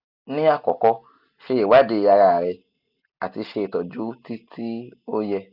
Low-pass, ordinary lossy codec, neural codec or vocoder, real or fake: 5.4 kHz; none; none; real